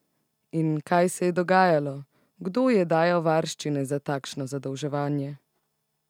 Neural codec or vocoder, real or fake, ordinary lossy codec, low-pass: none; real; none; 19.8 kHz